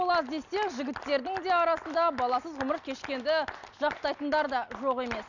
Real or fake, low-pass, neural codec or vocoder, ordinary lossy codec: real; 7.2 kHz; none; none